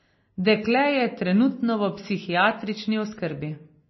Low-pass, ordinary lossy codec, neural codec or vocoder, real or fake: 7.2 kHz; MP3, 24 kbps; none; real